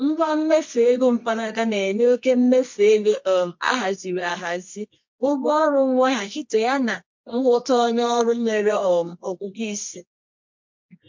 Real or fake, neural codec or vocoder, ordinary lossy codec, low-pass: fake; codec, 24 kHz, 0.9 kbps, WavTokenizer, medium music audio release; MP3, 48 kbps; 7.2 kHz